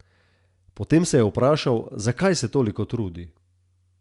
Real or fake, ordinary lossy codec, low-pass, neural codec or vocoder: real; Opus, 64 kbps; 10.8 kHz; none